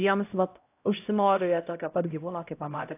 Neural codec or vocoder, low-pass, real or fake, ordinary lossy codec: codec, 16 kHz, 0.5 kbps, X-Codec, HuBERT features, trained on LibriSpeech; 3.6 kHz; fake; AAC, 24 kbps